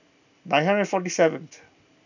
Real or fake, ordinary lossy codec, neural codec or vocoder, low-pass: real; none; none; 7.2 kHz